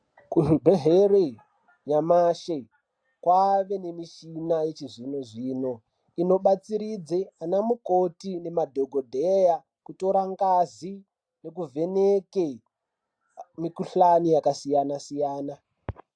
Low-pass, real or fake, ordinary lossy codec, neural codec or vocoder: 9.9 kHz; real; AAC, 64 kbps; none